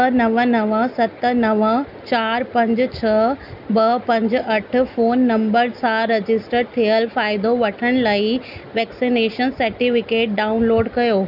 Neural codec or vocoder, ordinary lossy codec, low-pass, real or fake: none; none; 5.4 kHz; real